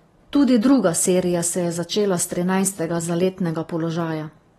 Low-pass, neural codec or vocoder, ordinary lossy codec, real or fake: 19.8 kHz; none; AAC, 32 kbps; real